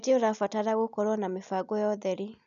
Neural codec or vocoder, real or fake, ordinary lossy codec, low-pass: none; real; none; 7.2 kHz